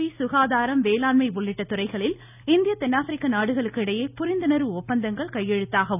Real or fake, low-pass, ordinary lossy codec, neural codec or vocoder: real; 3.6 kHz; none; none